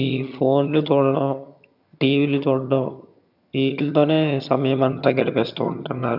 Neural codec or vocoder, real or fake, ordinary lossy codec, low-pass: vocoder, 22.05 kHz, 80 mel bands, HiFi-GAN; fake; none; 5.4 kHz